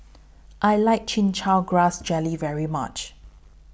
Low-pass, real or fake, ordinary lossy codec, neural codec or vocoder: none; real; none; none